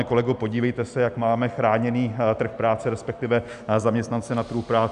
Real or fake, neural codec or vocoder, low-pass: real; none; 10.8 kHz